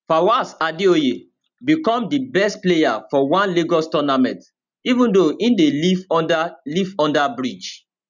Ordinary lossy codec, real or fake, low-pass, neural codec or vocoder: none; real; 7.2 kHz; none